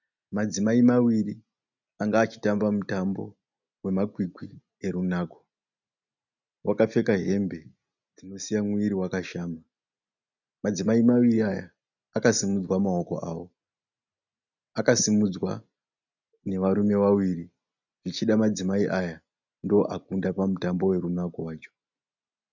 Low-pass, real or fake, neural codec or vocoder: 7.2 kHz; real; none